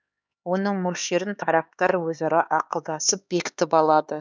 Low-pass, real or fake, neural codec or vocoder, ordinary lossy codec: none; fake; codec, 16 kHz, 4 kbps, X-Codec, HuBERT features, trained on LibriSpeech; none